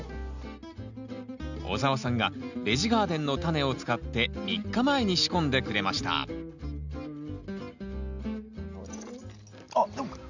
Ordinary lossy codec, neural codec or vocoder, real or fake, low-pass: none; none; real; 7.2 kHz